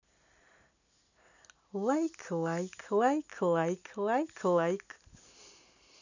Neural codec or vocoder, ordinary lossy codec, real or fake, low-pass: none; none; real; 7.2 kHz